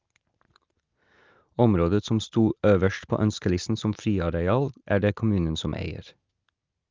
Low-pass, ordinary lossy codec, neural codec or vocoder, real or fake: 7.2 kHz; Opus, 24 kbps; none; real